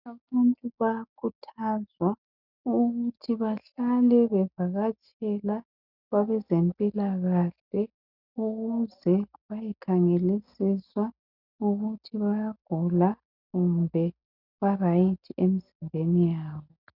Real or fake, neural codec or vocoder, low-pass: real; none; 5.4 kHz